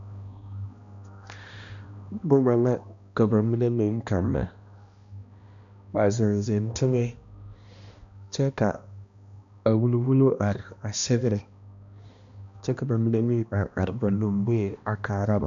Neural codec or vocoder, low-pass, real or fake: codec, 16 kHz, 1 kbps, X-Codec, HuBERT features, trained on balanced general audio; 7.2 kHz; fake